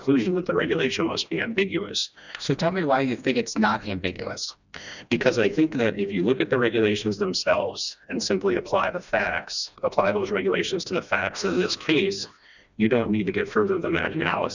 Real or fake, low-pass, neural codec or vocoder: fake; 7.2 kHz; codec, 16 kHz, 1 kbps, FreqCodec, smaller model